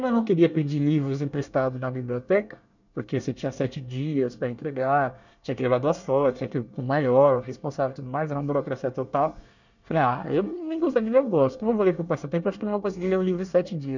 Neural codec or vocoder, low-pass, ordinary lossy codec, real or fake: codec, 24 kHz, 1 kbps, SNAC; 7.2 kHz; none; fake